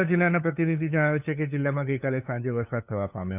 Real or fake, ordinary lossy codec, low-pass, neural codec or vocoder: fake; none; 3.6 kHz; codec, 16 kHz, 8 kbps, FunCodec, trained on LibriTTS, 25 frames a second